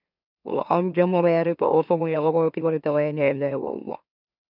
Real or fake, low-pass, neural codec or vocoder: fake; 5.4 kHz; autoencoder, 44.1 kHz, a latent of 192 numbers a frame, MeloTTS